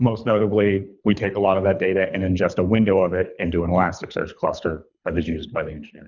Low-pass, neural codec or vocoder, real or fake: 7.2 kHz; codec, 24 kHz, 3 kbps, HILCodec; fake